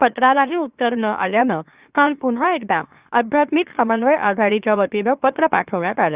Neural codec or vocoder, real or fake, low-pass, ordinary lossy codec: autoencoder, 44.1 kHz, a latent of 192 numbers a frame, MeloTTS; fake; 3.6 kHz; Opus, 64 kbps